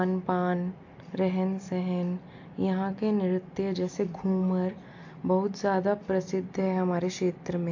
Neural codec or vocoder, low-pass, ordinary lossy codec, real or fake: none; 7.2 kHz; AAC, 48 kbps; real